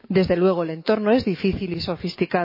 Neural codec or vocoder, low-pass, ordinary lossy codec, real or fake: autoencoder, 48 kHz, 128 numbers a frame, DAC-VAE, trained on Japanese speech; 5.4 kHz; MP3, 24 kbps; fake